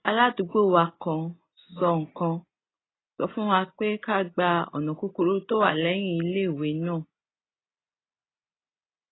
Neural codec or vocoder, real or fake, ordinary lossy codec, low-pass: none; real; AAC, 16 kbps; 7.2 kHz